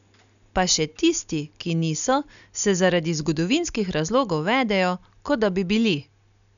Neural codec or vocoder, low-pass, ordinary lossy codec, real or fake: none; 7.2 kHz; none; real